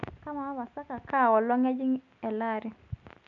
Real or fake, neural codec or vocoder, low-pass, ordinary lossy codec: real; none; 7.2 kHz; none